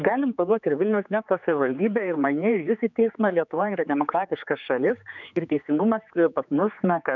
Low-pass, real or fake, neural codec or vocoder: 7.2 kHz; fake; codec, 16 kHz, 4 kbps, X-Codec, HuBERT features, trained on general audio